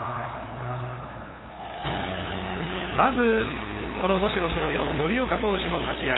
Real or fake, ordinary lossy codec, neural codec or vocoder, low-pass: fake; AAC, 16 kbps; codec, 16 kHz, 2 kbps, FunCodec, trained on LibriTTS, 25 frames a second; 7.2 kHz